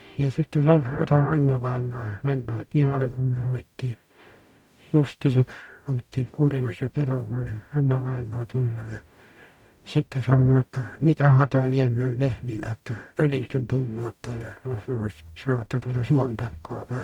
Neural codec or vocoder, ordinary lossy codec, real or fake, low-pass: codec, 44.1 kHz, 0.9 kbps, DAC; none; fake; 19.8 kHz